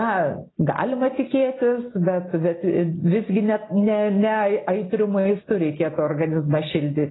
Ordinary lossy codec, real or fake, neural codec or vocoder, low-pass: AAC, 16 kbps; real; none; 7.2 kHz